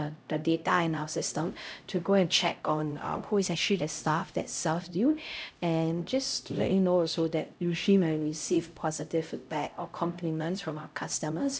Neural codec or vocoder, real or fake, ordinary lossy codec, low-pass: codec, 16 kHz, 0.5 kbps, X-Codec, HuBERT features, trained on LibriSpeech; fake; none; none